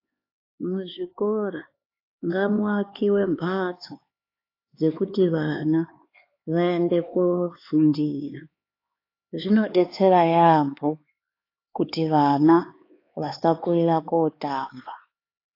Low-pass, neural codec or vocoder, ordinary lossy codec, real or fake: 5.4 kHz; codec, 16 kHz, 4 kbps, X-Codec, HuBERT features, trained on LibriSpeech; AAC, 32 kbps; fake